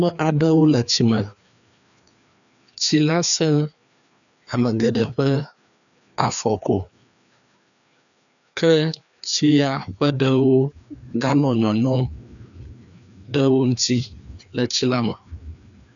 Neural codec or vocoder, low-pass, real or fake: codec, 16 kHz, 2 kbps, FreqCodec, larger model; 7.2 kHz; fake